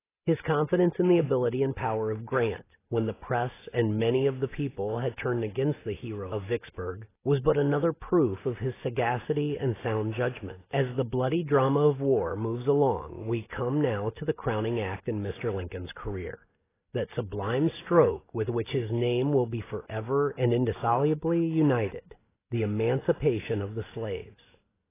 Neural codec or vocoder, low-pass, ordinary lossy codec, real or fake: none; 3.6 kHz; AAC, 16 kbps; real